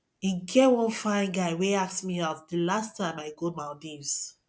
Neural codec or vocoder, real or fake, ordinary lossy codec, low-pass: none; real; none; none